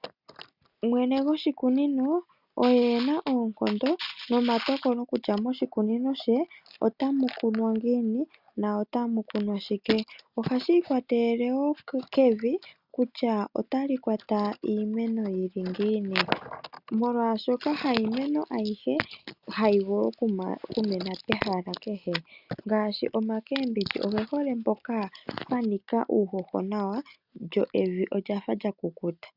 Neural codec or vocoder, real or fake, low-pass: none; real; 5.4 kHz